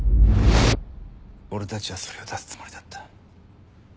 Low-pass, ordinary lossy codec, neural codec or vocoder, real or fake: none; none; none; real